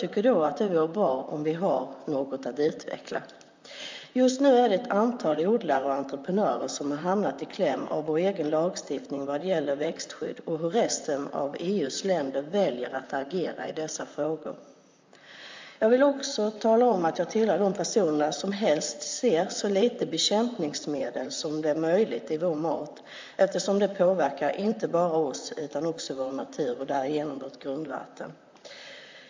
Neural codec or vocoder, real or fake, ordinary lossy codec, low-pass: vocoder, 44.1 kHz, 128 mel bands, Pupu-Vocoder; fake; MP3, 64 kbps; 7.2 kHz